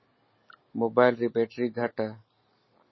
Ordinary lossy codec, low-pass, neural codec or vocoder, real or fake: MP3, 24 kbps; 7.2 kHz; none; real